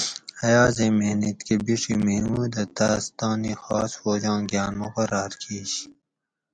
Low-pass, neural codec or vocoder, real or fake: 9.9 kHz; none; real